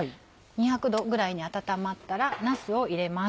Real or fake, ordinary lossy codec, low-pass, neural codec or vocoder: real; none; none; none